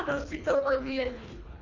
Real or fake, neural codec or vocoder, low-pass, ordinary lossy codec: fake; codec, 24 kHz, 1.5 kbps, HILCodec; 7.2 kHz; Opus, 64 kbps